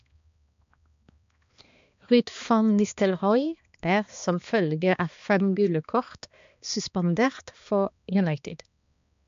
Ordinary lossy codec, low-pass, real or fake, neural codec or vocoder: MP3, 64 kbps; 7.2 kHz; fake; codec, 16 kHz, 2 kbps, X-Codec, HuBERT features, trained on balanced general audio